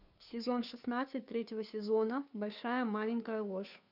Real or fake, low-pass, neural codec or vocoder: fake; 5.4 kHz; codec, 16 kHz, 2 kbps, FunCodec, trained on Chinese and English, 25 frames a second